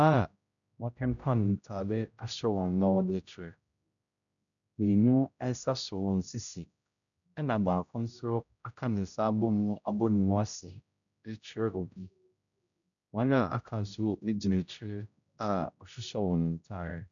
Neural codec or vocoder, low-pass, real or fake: codec, 16 kHz, 0.5 kbps, X-Codec, HuBERT features, trained on general audio; 7.2 kHz; fake